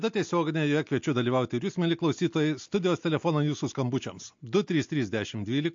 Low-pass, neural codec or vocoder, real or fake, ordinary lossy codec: 7.2 kHz; none; real; MP3, 48 kbps